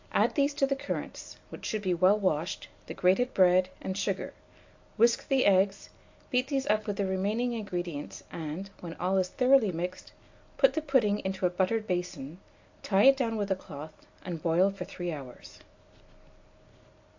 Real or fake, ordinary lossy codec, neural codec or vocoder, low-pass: real; AAC, 48 kbps; none; 7.2 kHz